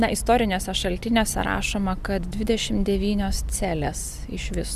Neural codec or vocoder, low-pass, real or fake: none; 14.4 kHz; real